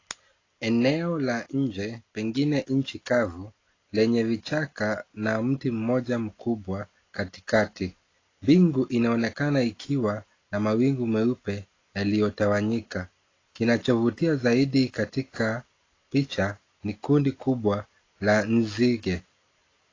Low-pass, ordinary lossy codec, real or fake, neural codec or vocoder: 7.2 kHz; AAC, 32 kbps; real; none